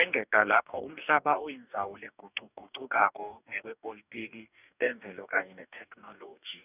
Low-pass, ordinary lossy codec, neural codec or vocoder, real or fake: 3.6 kHz; none; codec, 44.1 kHz, 2.6 kbps, DAC; fake